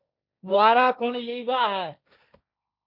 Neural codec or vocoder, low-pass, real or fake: codec, 16 kHz, 1.1 kbps, Voila-Tokenizer; 5.4 kHz; fake